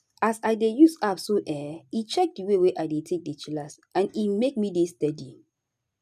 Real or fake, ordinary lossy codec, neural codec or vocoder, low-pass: real; none; none; 14.4 kHz